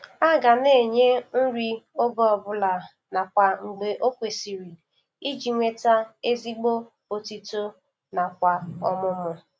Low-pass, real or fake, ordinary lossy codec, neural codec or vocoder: none; real; none; none